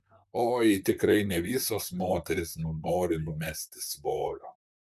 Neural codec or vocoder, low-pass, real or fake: vocoder, 44.1 kHz, 128 mel bands, Pupu-Vocoder; 14.4 kHz; fake